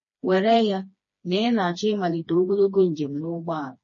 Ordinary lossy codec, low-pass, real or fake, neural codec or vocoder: MP3, 32 kbps; 7.2 kHz; fake; codec, 16 kHz, 2 kbps, FreqCodec, smaller model